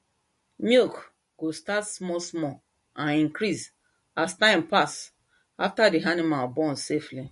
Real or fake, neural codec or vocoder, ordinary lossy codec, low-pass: real; none; MP3, 48 kbps; 14.4 kHz